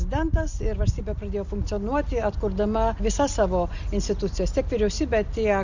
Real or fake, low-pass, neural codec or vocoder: real; 7.2 kHz; none